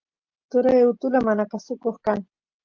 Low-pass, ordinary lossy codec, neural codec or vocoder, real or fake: 7.2 kHz; Opus, 32 kbps; none; real